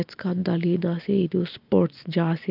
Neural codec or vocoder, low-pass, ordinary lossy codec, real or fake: none; 5.4 kHz; Opus, 24 kbps; real